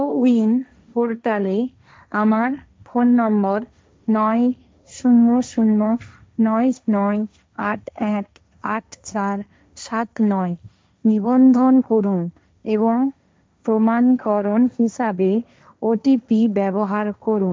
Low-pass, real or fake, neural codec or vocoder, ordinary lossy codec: none; fake; codec, 16 kHz, 1.1 kbps, Voila-Tokenizer; none